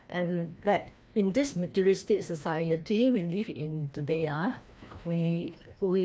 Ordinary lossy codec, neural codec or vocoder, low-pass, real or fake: none; codec, 16 kHz, 1 kbps, FreqCodec, larger model; none; fake